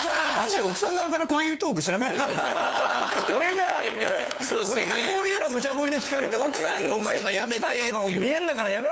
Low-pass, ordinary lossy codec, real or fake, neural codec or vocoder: none; none; fake; codec, 16 kHz, 2 kbps, FunCodec, trained on LibriTTS, 25 frames a second